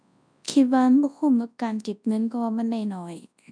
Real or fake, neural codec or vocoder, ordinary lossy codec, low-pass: fake; codec, 24 kHz, 0.9 kbps, WavTokenizer, large speech release; none; 9.9 kHz